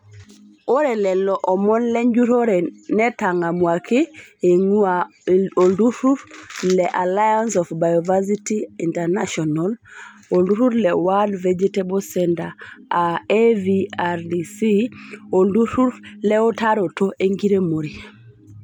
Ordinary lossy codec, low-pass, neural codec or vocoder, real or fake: none; 19.8 kHz; none; real